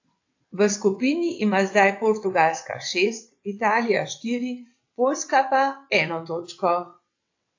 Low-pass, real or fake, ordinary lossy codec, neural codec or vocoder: 7.2 kHz; fake; AAC, 48 kbps; vocoder, 22.05 kHz, 80 mel bands, WaveNeXt